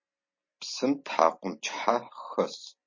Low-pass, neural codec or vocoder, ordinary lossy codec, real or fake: 7.2 kHz; none; MP3, 32 kbps; real